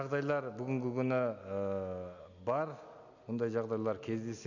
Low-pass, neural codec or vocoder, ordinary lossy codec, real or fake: 7.2 kHz; none; none; real